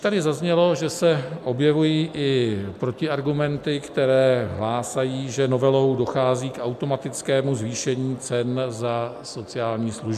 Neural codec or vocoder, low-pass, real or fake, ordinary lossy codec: none; 14.4 kHz; real; MP3, 96 kbps